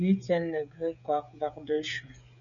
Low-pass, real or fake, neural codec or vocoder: 7.2 kHz; fake; codec, 16 kHz, 16 kbps, FreqCodec, smaller model